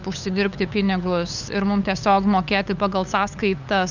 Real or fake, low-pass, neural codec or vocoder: fake; 7.2 kHz; codec, 16 kHz, 8 kbps, FunCodec, trained on LibriTTS, 25 frames a second